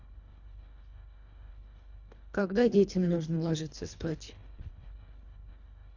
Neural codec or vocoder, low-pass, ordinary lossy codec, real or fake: codec, 24 kHz, 1.5 kbps, HILCodec; 7.2 kHz; none; fake